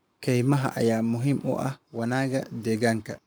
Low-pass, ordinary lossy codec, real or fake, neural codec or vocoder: none; none; fake; codec, 44.1 kHz, 7.8 kbps, Pupu-Codec